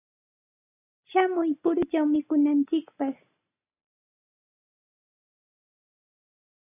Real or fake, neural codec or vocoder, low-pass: real; none; 3.6 kHz